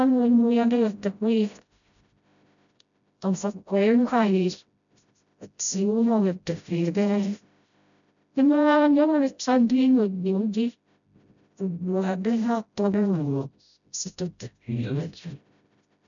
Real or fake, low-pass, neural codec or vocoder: fake; 7.2 kHz; codec, 16 kHz, 0.5 kbps, FreqCodec, smaller model